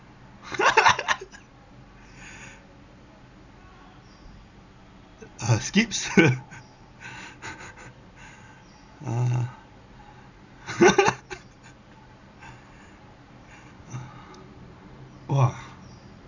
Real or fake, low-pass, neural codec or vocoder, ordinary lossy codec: real; 7.2 kHz; none; none